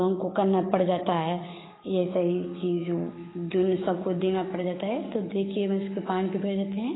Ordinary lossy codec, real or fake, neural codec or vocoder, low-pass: AAC, 16 kbps; fake; codec, 44.1 kHz, 7.8 kbps, DAC; 7.2 kHz